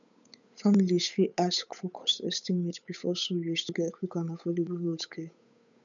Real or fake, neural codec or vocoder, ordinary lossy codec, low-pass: fake; codec, 16 kHz, 8 kbps, FunCodec, trained on Chinese and English, 25 frames a second; none; 7.2 kHz